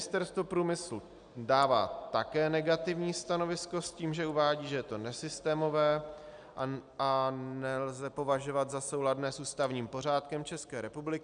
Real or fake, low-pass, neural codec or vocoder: real; 9.9 kHz; none